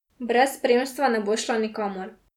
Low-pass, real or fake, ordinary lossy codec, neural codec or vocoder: 19.8 kHz; real; none; none